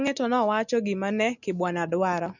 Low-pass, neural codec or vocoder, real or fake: 7.2 kHz; none; real